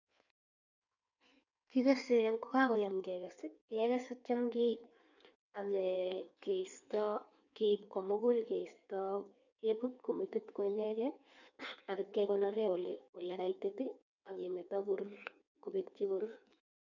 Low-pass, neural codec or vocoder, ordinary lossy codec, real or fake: 7.2 kHz; codec, 16 kHz in and 24 kHz out, 1.1 kbps, FireRedTTS-2 codec; none; fake